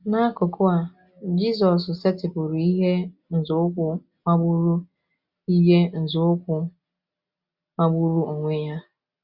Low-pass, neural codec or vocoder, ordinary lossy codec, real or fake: 5.4 kHz; none; Opus, 64 kbps; real